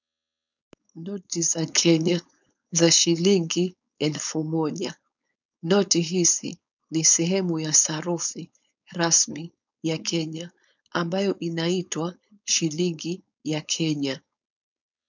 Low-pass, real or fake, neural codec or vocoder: 7.2 kHz; fake; codec, 16 kHz, 4.8 kbps, FACodec